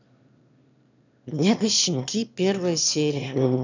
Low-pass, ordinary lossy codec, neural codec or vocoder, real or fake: 7.2 kHz; none; autoencoder, 22.05 kHz, a latent of 192 numbers a frame, VITS, trained on one speaker; fake